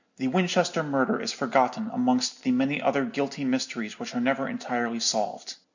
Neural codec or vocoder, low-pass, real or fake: none; 7.2 kHz; real